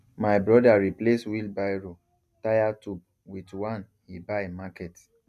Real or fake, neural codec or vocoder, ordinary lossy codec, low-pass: real; none; none; 14.4 kHz